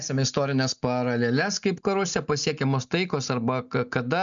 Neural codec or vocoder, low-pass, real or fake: none; 7.2 kHz; real